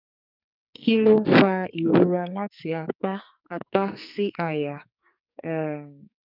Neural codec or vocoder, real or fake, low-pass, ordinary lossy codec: codec, 44.1 kHz, 2.6 kbps, SNAC; fake; 5.4 kHz; none